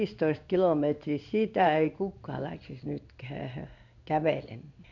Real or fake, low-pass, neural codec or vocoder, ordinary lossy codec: fake; 7.2 kHz; codec, 16 kHz in and 24 kHz out, 1 kbps, XY-Tokenizer; none